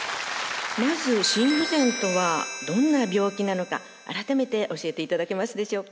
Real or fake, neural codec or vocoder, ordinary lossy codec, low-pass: real; none; none; none